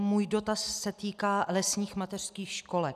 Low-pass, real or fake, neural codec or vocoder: 14.4 kHz; real; none